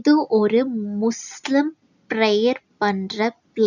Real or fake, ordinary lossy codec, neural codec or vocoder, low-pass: real; none; none; 7.2 kHz